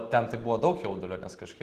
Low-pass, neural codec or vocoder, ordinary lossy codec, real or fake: 14.4 kHz; none; Opus, 16 kbps; real